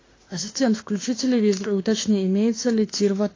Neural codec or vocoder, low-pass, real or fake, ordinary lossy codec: autoencoder, 48 kHz, 32 numbers a frame, DAC-VAE, trained on Japanese speech; 7.2 kHz; fake; AAC, 32 kbps